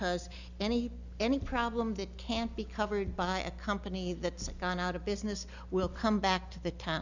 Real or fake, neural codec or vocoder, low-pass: real; none; 7.2 kHz